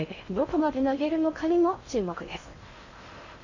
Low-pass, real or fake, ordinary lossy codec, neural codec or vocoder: 7.2 kHz; fake; Opus, 64 kbps; codec, 16 kHz in and 24 kHz out, 0.6 kbps, FocalCodec, streaming, 2048 codes